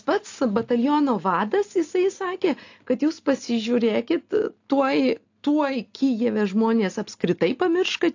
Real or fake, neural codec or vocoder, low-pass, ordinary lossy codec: real; none; 7.2 kHz; AAC, 48 kbps